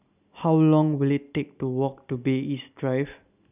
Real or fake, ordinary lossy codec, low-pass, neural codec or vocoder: real; none; 3.6 kHz; none